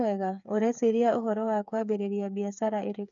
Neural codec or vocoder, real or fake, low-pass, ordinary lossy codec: codec, 16 kHz, 8 kbps, FreqCodec, smaller model; fake; 7.2 kHz; none